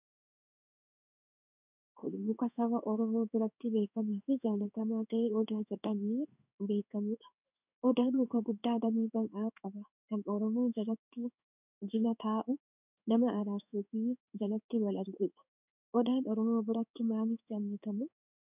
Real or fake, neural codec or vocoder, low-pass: fake; codec, 16 kHz in and 24 kHz out, 1 kbps, XY-Tokenizer; 3.6 kHz